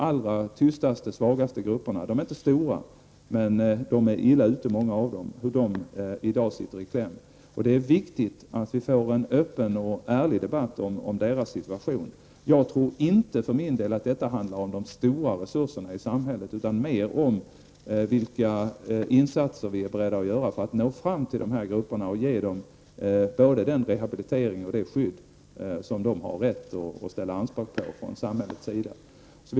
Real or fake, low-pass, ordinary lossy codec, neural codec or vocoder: real; none; none; none